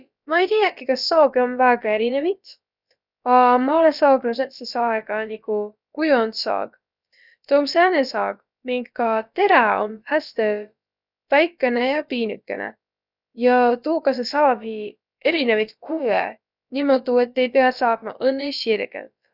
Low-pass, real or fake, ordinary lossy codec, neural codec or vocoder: 5.4 kHz; fake; none; codec, 16 kHz, about 1 kbps, DyCAST, with the encoder's durations